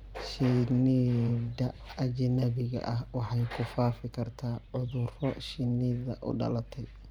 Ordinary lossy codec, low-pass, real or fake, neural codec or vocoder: none; 19.8 kHz; fake; vocoder, 44.1 kHz, 128 mel bands every 512 samples, BigVGAN v2